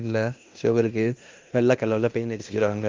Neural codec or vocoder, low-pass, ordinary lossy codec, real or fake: codec, 16 kHz in and 24 kHz out, 0.9 kbps, LongCat-Audio-Codec, fine tuned four codebook decoder; 7.2 kHz; Opus, 32 kbps; fake